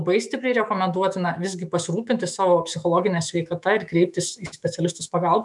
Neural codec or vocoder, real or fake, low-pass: autoencoder, 48 kHz, 128 numbers a frame, DAC-VAE, trained on Japanese speech; fake; 10.8 kHz